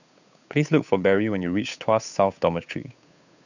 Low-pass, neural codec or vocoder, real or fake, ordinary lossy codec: 7.2 kHz; codec, 16 kHz, 8 kbps, FunCodec, trained on Chinese and English, 25 frames a second; fake; none